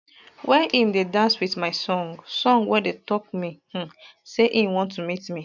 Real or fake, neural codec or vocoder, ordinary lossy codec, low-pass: real; none; none; 7.2 kHz